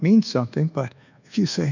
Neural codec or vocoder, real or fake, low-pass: codec, 24 kHz, 1.2 kbps, DualCodec; fake; 7.2 kHz